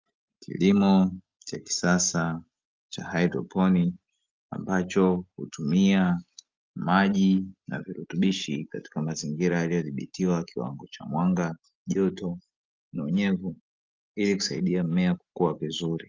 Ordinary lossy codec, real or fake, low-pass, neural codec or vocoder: Opus, 32 kbps; real; 7.2 kHz; none